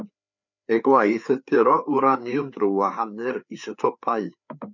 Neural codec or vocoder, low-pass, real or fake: codec, 16 kHz, 4 kbps, FreqCodec, larger model; 7.2 kHz; fake